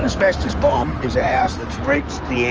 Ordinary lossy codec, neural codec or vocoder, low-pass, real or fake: Opus, 24 kbps; codec, 16 kHz in and 24 kHz out, 2.2 kbps, FireRedTTS-2 codec; 7.2 kHz; fake